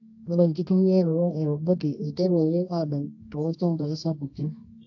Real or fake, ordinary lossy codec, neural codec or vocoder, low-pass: fake; AAC, 48 kbps; codec, 24 kHz, 0.9 kbps, WavTokenizer, medium music audio release; 7.2 kHz